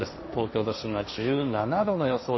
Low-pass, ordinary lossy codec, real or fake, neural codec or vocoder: 7.2 kHz; MP3, 24 kbps; fake; codec, 16 kHz, 1.1 kbps, Voila-Tokenizer